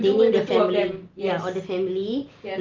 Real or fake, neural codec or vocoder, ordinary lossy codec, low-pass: real; none; Opus, 24 kbps; 7.2 kHz